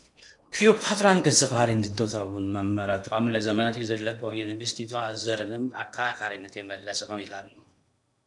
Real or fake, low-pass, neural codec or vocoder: fake; 10.8 kHz; codec, 16 kHz in and 24 kHz out, 0.8 kbps, FocalCodec, streaming, 65536 codes